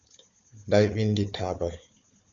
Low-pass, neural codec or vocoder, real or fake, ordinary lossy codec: 7.2 kHz; codec, 16 kHz, 4 kbps, FunCodec, trained on Chinese and English, 50 frames a second; fake; MP3, 64 kbps